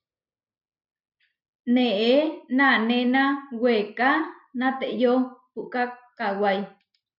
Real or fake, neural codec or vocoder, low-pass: real; none; 5.4 kHz